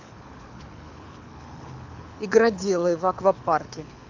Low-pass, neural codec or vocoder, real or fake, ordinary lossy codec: 7.2 kHz; codec, 24 kHz, 6 kbps, HILCodec; fake; AAC, 48 kbps